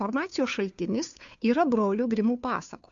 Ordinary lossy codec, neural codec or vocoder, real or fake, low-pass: AAC, 64 kbps; codec, 16 kHz, 2 kbps, FunCodec, trained on Chinese and English, 25 frames a second; fake; 7.2 kHz